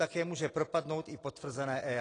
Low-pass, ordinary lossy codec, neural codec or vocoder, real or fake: 9.9 kHz; AAC, 32 kbps; none; real